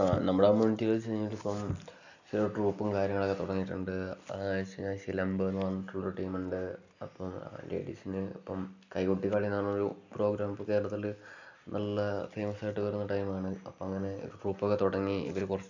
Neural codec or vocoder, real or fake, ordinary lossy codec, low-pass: none; real; none; 7.2 kHz